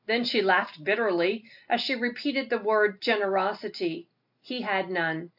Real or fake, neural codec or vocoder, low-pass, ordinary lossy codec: real; none; 5.4 kHz; AAC, 48 kbps